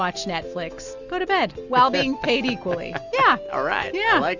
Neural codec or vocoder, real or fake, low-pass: none; real; 7.2 kHz